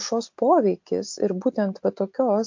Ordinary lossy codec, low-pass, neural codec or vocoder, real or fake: MP3, 48 kbps; 7.2 kHz; none; real